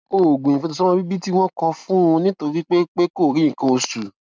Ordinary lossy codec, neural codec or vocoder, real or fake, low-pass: none; none; real; none